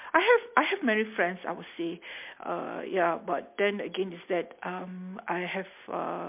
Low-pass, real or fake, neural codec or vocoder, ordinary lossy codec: 3.6 kHz; real; none; MP3, 32 kbps